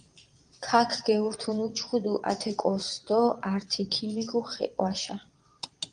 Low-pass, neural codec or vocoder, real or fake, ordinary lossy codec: 9.9 kHz; vocoder, 22.05 kHz, 80 mel bands, Vocos; fake; Opus, 32 kbps